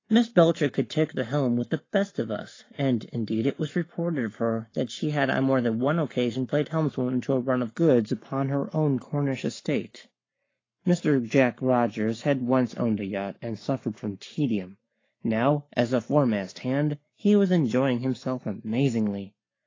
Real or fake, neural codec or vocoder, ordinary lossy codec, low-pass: fake; codec, 44.1 kHz, 7.8 kbps, Pupu-Codec; AAC, 32 kbps; 7.2 kHz